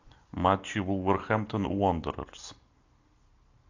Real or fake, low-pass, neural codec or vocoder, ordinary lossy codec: real; 7.2 kHz; none; AAC, 48 kbps